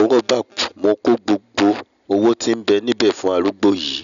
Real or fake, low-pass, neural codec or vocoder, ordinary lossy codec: real; 7.2 kHz; none; none